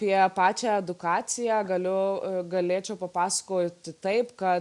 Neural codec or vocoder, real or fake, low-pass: none; real; 10.8 kHz